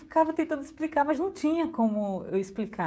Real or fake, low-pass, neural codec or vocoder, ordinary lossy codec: fake; none; codec, 16 kHz, 16 kbps, FreqCodec, smaller model; none